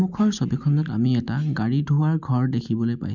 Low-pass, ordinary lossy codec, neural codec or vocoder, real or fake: 7.2 kHz; none; none; real